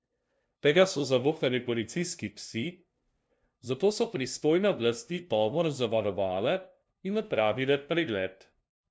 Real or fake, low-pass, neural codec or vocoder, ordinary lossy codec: fake; none; codec, 16 kHz, 0.5 kbps, FunCodec, trained on LibriTTS, 25 frames a second; none